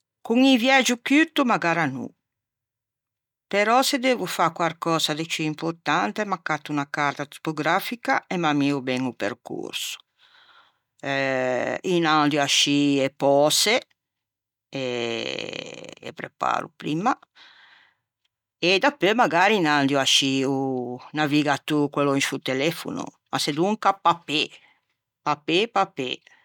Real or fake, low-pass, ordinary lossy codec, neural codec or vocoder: real; 19.8 kHz; none; none